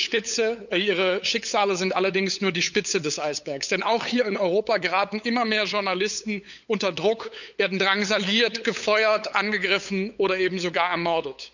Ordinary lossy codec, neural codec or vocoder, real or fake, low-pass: none; codec, 16 kHz, 8 kbps, FunCodec, trained on LibriTTS, 25 frames a second; fake; 7.2 kHz